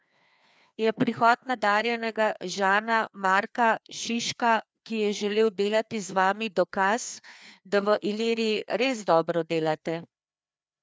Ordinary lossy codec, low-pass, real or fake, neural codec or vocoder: none; none; fake; codec, 16 kHz, 2 kbps, FreqCodec, larger model